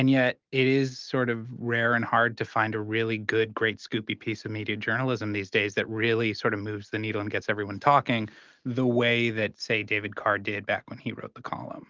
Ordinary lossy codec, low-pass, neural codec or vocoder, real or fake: Opus, 32 kbps; 7.2 kHz; none; real